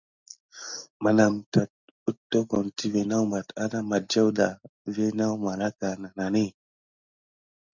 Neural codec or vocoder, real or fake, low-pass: none; real; 7.2 kHz